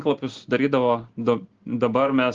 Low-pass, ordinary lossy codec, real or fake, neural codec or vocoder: 7.2 kHz; Opus, 16 kbps; real; none